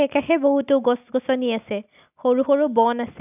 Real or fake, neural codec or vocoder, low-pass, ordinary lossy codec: real; none; 3.6 kHz; none